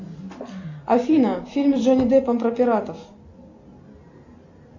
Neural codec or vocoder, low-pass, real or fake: none; 7.2 kHz; real